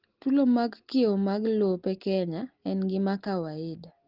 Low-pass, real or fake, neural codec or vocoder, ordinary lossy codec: 5.4 kHz; real; none; Opus, 16 kbps